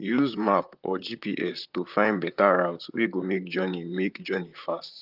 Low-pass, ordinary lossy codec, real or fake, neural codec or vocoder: 5.4 kHz; Opus, 32 kbps; fake; vocoder, 44.1 kHz, 128 mel bands, Pupu-Vocoder